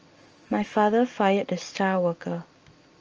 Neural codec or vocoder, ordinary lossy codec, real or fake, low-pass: none; Opus, 24 kbps; real; 7.2 kHz